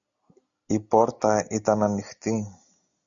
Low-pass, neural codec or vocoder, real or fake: 7.2 kHz; none; real